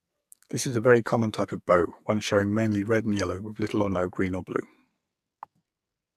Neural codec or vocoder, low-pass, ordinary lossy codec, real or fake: codec, 44.1 kHz, 2.6 kbps, SNAC; 14.4 kHz; none; fake